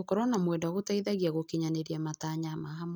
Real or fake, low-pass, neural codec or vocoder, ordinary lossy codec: real; none; none; none